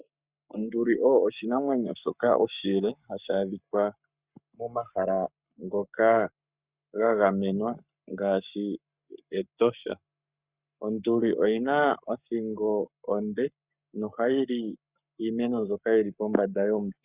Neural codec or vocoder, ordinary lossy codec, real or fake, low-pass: codec, 44.1 kHz, 7.8 kbps, Pupu-Codec; Opus, 64 kbps; fake; 3.6 kHz